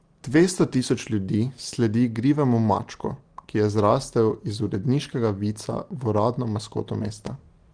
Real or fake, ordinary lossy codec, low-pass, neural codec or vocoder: real; Opus, 24 kbps; 9.9 kHz; none